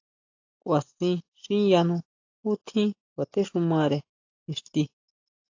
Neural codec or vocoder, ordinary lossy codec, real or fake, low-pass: none; AAC, 48 kbps; real; 7.2 kHz